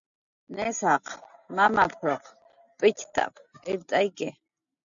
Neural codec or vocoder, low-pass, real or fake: none; 7.2 kHz; real